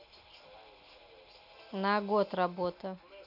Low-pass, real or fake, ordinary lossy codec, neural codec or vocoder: 5.4 kHz; real; none; none